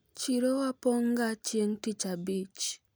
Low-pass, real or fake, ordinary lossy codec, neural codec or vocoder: none; real; none; none